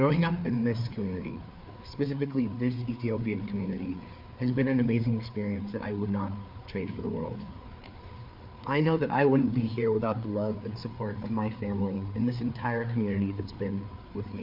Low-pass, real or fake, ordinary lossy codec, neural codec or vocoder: 5.4 kHz; fake; MP3, 48 kbps; codec, 16 kHz, 4 kbps, FreqCodec, larger model